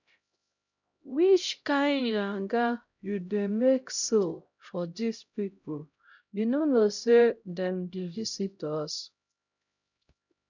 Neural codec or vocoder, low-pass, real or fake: codec, 16 kHz, 0.5 kbps, X-Codec, HuBERT features, trained on LibriSpeech; 7.2 kHz; fake